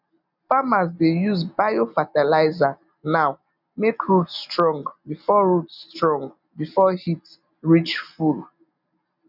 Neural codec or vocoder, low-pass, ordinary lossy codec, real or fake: none; 5.4 kHz; none; real